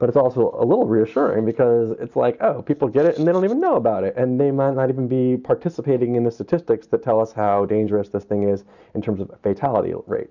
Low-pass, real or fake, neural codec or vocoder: 7.2 kHz; real; none